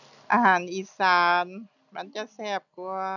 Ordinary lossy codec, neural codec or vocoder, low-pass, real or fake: none; none; 7.2 kHz; real